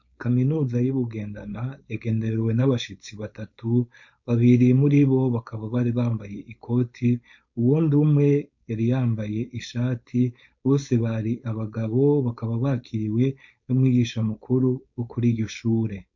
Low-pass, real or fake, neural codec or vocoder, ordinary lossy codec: 7.2 kHz; fake; codec, 16 kHz, 4.8 kbps, FACodec; MP3, 48 kbps